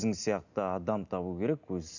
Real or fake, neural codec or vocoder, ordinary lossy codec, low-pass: real; none; none; 7.2 kHz